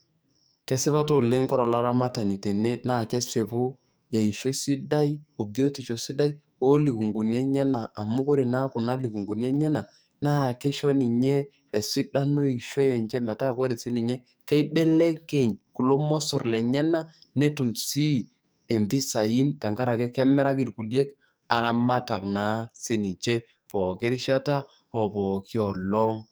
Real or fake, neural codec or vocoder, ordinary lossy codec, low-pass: fake; codec, 44.1 kHz, 2.6 kbps, SNAC; none; none